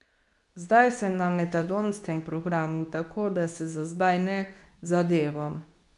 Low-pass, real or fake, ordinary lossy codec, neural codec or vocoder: 10.8 kHz; fake; none; codec, 24 kHz, 0.9 kbps, WavTokenizer, medium speech release version 2